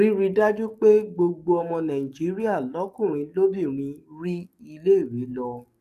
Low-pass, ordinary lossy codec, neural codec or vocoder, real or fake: 14.4 kHz; MP3, 96 kbps; codec, 44.1 kHz, 7.8 kbps, DAC; fake